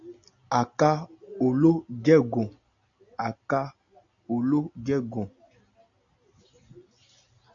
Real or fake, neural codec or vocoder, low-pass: real; none; 7.2 kHz